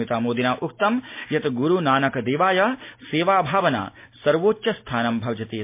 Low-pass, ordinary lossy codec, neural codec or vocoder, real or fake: 3.6 kHz; MP3, 24 kbps; none; real